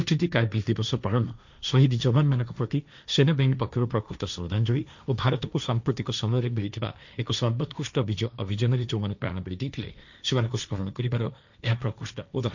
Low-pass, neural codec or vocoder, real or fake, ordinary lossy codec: 7.2 kHz; codec, 16 kHz, 1.1 kbps, Voila-Tokenizer; fake; none